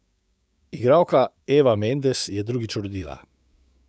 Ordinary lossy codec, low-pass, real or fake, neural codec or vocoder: none; none; fake; codec, 16 kHz, 6 kbps, DAC